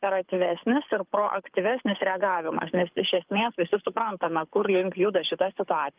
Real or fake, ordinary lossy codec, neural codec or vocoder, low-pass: fake; Opus, 32 kbps; codec, 24 kHz, 6 kbps, HILCodec; 3.6 kHz